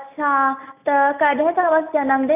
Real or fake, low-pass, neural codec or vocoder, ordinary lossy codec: real; 3.6 kHz; none; none